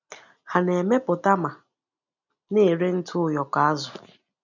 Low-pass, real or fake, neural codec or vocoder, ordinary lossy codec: 7.2 kHz; real; none; none